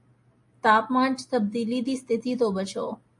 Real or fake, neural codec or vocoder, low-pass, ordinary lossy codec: real; none; 10.8 kHz; MP3, 48 kbps